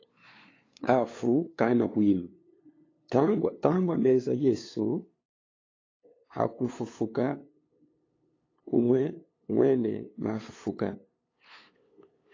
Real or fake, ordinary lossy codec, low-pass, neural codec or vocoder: fake; AAC, 32 kbps; 7.2 kHz; codec, 16 kHz, 2 kbps, FunCodec, trained on LibriTTS, 25 frames a second